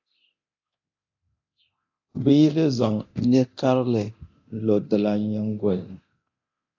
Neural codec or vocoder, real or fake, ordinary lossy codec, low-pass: codec, 24 kHz, 0.9 kbps, DualCodec; fake; AAC, 48 kbps; 7.2 kHz